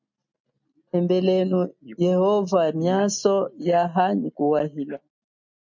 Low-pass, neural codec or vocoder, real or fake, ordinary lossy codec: 7.2 kHz; none; real; MP3, 64 kbps